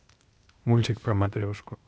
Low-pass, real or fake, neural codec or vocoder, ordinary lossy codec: none; fake; codec, 16 kHz, 0.8 kbps, ZipCodec; none